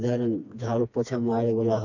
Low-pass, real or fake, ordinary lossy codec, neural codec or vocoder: 7.2 kHz; fake; none; codec, 16 kHz, 2 kbps, FreqCodec, smaller model